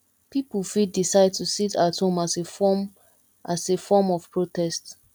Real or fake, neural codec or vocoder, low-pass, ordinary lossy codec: real; none; 19.8 kHz; none